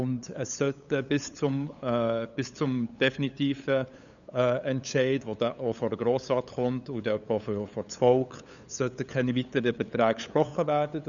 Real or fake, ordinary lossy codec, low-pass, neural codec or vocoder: fake; none; 7.2 kHz; codec, 16 kHz, 8 kbps, FunCodec, trained on LibriTTS, 25 frames a second